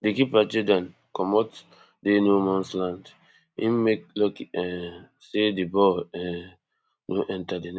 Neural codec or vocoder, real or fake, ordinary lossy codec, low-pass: none; real; none; none